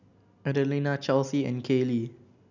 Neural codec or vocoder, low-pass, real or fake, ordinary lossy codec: none; 7.2 kHz; real; none